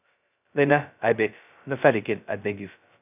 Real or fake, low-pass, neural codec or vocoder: fake; 3.6 kHz; codec, 16 kHz, 0.2 kbps, FocalCodec